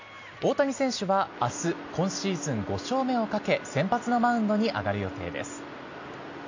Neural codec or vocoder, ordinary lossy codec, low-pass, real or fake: none; AAC, 48 kbps; 7.2 kHz; real